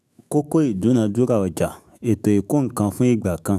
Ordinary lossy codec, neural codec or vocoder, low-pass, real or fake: none; autoencoder, 48 kHz, 128 numbers a frame, DAC-VAE, trained on Japanese speech; 14.4 kHz; fake